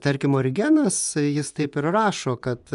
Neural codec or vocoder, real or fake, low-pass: vocoder, 24 kHz, 100 mel bands, Vocos; fake; 10.8 kHz